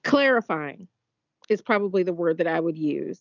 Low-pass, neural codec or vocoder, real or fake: 7.2 kHz; none; real